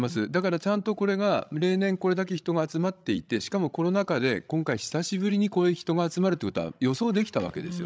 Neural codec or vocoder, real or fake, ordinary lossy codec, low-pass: codec, 16 kHz, 16 kbps, FreqCodec, larger model; fake; none; none